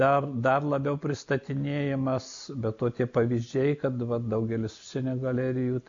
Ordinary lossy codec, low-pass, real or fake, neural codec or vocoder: AAC, 64 kbps; 7.2 kHz; real; none